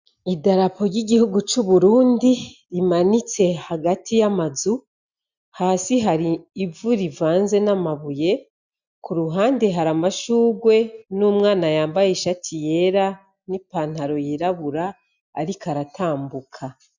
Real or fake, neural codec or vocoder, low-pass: real; none; 7.2 kHz